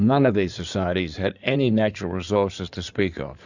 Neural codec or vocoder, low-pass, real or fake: codec, 16 kHz in and 24 kHz out, 2.2 kbps, FireRedTTS-2 codec; 7.2 kHz; fake